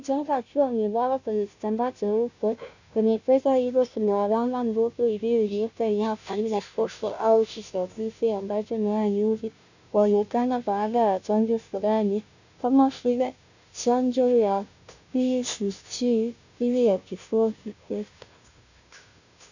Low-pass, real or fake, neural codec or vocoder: 7.2 kHz; fake; codec, 16 kHz, 0.5 kbps, FunCodec, trained on Chinese and English, 25 frames a second